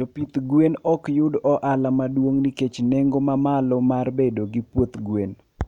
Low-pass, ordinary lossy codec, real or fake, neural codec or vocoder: 19.8 kHz; none; real; none